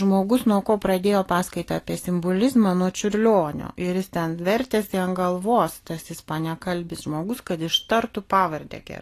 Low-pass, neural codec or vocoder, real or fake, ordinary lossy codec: 14.4 kHz; none; real; AAC, 48 kbps